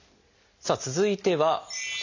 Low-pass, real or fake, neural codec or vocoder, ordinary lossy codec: 7.2 kHz; real; none; none